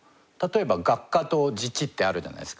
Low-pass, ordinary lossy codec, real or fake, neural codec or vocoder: none; none; real; none